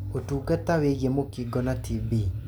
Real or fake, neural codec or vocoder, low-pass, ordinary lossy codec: real; none; none; none